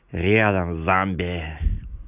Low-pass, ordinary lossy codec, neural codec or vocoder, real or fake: 3.6 kHz; none; none; real